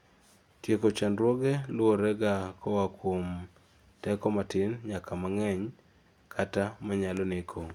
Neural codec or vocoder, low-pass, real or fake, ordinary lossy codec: none; 19.8 kHz; real; none